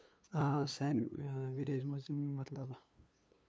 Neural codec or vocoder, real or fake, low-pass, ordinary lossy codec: codec, 16 kHz, 2 kbps, FunCodec, trained on LibriTTS, 25 frames a second; fake; none; none